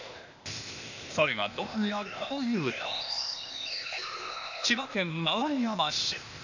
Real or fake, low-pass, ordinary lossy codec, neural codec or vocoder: fake; 7.2 kHz; none; codec, 16 kHz, 0.8 kbps, ZipCodec